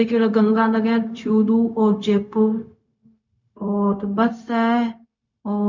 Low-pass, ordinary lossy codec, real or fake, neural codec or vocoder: 7.2 kHz; none; fake; codec, 16 kHz, 0.4 kbps, LongCat-Audio-Codec